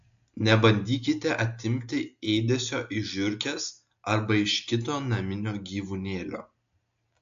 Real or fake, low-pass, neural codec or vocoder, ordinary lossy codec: real; 7.2 kHz; none; AAC, 64 kbps